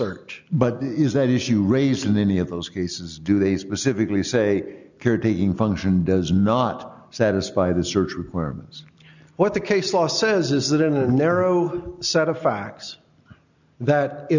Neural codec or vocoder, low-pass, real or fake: none; 7.2 kHz; real